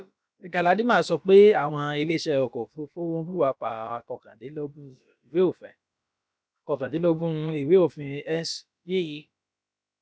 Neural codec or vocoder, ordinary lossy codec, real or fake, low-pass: codec, 16 kHz, about 1 kbps, DyCAST, with the encoder's durations; none; fake; none